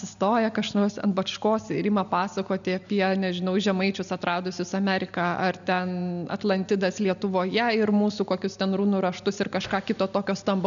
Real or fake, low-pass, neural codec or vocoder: real; 7.2 kHz; none